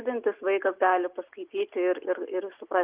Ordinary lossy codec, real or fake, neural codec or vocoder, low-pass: Opus, 32 kbps; real; none; 3.6 kHz